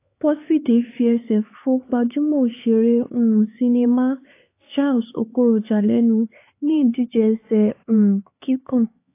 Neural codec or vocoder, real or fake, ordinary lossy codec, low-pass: codec, 16 kHz, 4 kbps, X-Codec, HuBERT features, trained on LibriSpeech; fake; AAC, 24 kbps; 3.6 kHz